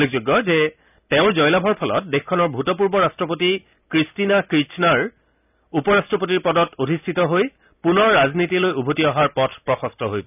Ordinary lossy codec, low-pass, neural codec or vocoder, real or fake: none; 3.6 kHz; none; real